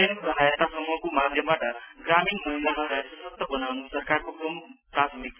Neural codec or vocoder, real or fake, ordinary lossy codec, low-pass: none; real; none; 3.6 kHz